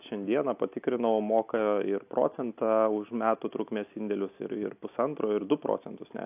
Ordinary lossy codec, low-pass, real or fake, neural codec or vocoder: AAC, 32 kbps; 3.6 kHz; real; none